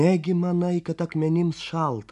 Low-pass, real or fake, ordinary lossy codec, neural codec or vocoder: 10.8 kHz; real; AAC, 96 kbps; none